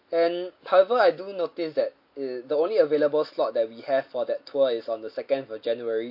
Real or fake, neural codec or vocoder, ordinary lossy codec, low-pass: real; none; MP3, 32 kbps; 5.4 kHz